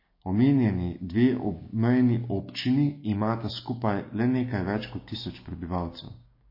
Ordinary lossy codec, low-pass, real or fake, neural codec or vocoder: MP3, 24 kbps; 5.4 kHz; fake; codec, 16 kHz, 6 kbps, DAC